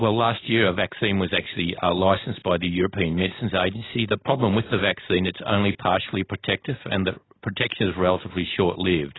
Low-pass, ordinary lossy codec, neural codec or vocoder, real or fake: 7.2 kHz; AAC, 16 kbps; none; real